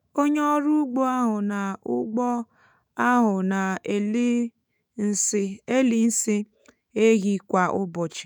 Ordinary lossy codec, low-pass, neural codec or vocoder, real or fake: none; none; autoencoder, 48 kHz, 128 numbers a frame, DAC-VAE, trained on Japanese speech; fake